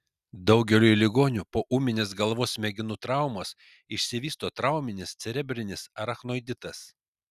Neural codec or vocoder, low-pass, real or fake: none; 14.4 kHz; real